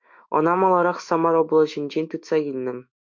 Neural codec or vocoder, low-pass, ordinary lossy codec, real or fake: autoencoder, 48 kHz, 128 numbers a frame, DAC-VAE, trained on Japanese speech; 7.2 kHz; MP3, 64 kbps; fake